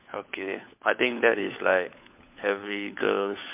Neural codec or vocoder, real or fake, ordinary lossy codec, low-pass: codec, 16 kHz, 4 kbps, FunCodec, trained on LibriTTS, 50 frames a second; fake; MP3, 32 kbps; 3.6 kHz